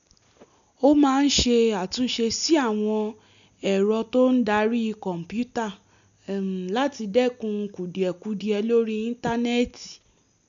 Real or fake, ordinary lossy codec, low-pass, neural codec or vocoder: real; none; 7.2 kHz; none